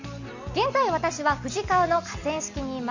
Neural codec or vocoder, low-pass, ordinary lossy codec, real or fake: none; 7.2 kHz; Opus, 64 kbps; real